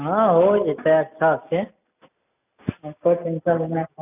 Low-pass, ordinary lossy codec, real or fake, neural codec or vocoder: 3.6 kHz; none; real; none